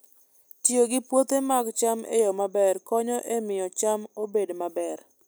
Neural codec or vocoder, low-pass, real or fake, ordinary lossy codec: none; none; real; none